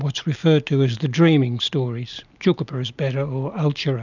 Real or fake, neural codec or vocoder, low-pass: real; none; 7.2 kHz